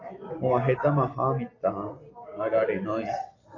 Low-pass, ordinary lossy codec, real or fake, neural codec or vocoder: 7.2 kHz; AAC, 32 kbps; real; none